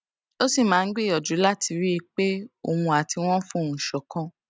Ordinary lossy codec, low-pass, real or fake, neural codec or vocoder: none; none; real; none